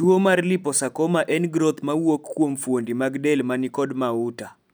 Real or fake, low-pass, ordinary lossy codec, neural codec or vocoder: real; none; none; none